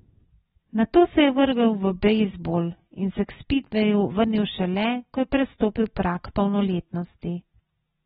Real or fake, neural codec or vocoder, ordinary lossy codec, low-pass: real; none; AAC, 16 kbps; 19.8 kHz